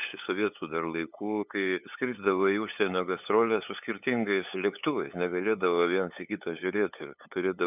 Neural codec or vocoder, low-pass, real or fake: codec, 16 kHz, 8 kbps, FunCodec, trained on LibriTTS, 25 frames a second; 3.6 kHz; fake